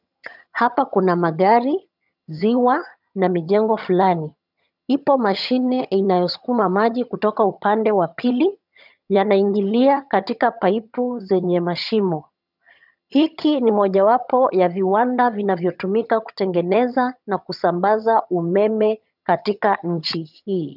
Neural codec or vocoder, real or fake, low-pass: vocoder, 22.05 kHz, 80 mel bands, HiFi-GAN; fake; 5.4 kHz